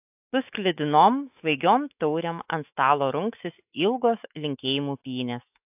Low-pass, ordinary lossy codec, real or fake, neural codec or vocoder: 3.6 kHz; AAC, 32 kbps; fake; autoencoder, 48 kHz, 128 numbers a frame, DAC-VAE, trained on Japanese speech